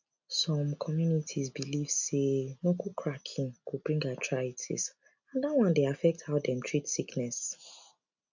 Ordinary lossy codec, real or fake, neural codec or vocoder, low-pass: none; real; none; 7.2 kHz